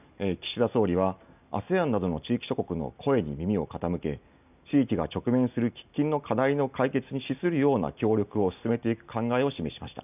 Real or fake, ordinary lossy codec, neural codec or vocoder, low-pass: real; none; none; 3.6 kHz